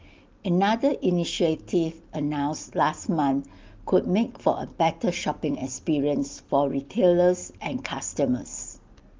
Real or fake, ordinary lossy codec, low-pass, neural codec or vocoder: real; Opus, 32 kbps; 7.2 kHz; none